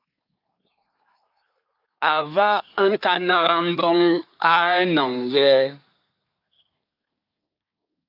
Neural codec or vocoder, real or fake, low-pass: codec, 24 kHz, 1 kbps, SNAC; fake; 5.4 kHz